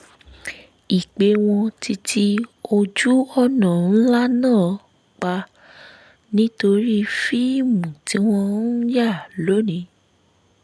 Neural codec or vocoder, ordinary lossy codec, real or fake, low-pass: none; none; real; none